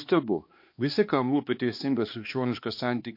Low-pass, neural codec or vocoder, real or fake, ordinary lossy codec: 5.4 kHz; codec, 16 kHz, 2 kbps, X-Codec, HuBERT features, trained on balanced general audio; fake; MP3, 32 kbps